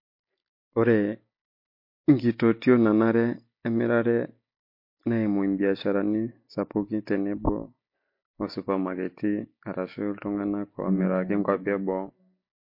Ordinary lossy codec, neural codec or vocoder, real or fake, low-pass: MP3, 32 kbps; none; real; 5.4 kHz